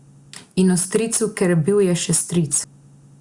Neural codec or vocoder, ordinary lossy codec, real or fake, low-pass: none; Opus, 64 kbps; real; 10.8 kHz